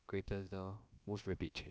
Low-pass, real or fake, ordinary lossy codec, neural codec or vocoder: none; fake; none; codec, 16 kHz, about 1 kbps, DyCAST, with the encoder's durations